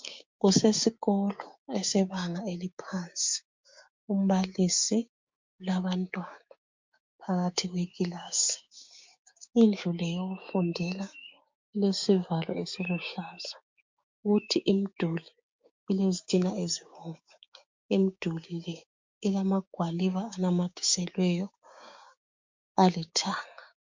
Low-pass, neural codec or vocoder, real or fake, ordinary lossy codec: 7.2 kHz; codec, 16 kHz, 6 kbps, DAC; fake; MP3, 64 kbps